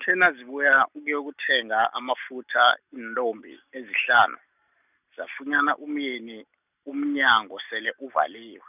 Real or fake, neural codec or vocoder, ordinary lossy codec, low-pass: real; none; none; 3.6 kHz